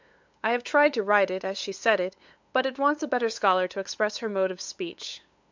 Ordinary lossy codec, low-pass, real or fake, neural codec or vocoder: MP3, 64 kbps; 7.2 kHz; fake; codec, 16 kHz, 8 kbps, FunCodec, trained on LibriTTS, 25 frames a second